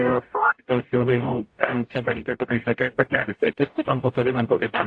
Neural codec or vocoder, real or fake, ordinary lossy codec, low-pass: codec, 44.1 kHz, 0.9 kbps, DAC; fake; AAC, 48 kbps; 9.9 kHz